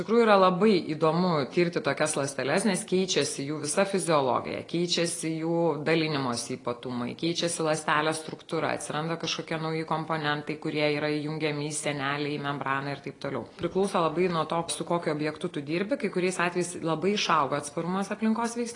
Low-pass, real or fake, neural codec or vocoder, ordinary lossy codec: 10.8 kHz; real; none; AAC, 32 kbps